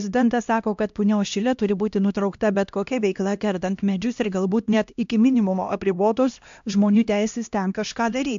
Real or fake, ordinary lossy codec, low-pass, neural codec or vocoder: fake; AAC, 64 kbps; 7.2 kHz; codec, 16 kHz, 1 kbps, X-Codec, HuBERT features, trained on LibriSpeech